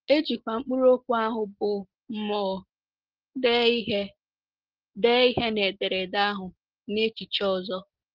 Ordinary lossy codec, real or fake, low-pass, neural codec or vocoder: Opus, 16 kbps; real; 5.4 kHz; none